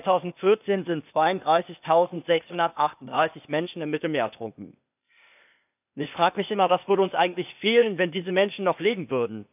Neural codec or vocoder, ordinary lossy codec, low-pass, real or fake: codec, 16 kHz, 0.8 kbps, ZipCodec; none; 3.6 kHz; fake